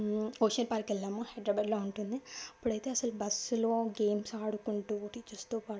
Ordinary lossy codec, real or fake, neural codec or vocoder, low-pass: none; real; none; none